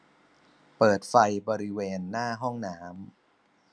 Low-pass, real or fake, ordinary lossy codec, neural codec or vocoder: none; real; none; none